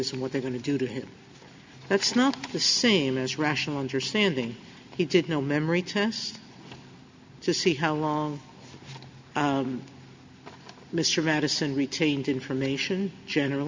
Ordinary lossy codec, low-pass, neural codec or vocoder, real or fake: MP3, 64 kbps; 7.2 kHz; none; real